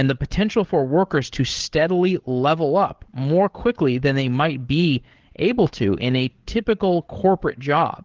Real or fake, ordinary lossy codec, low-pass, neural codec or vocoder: fake; Opus, 16 kbps; 7.2 kHz; codec, 16 kHz, 8 kbps, FreqCodec, larger model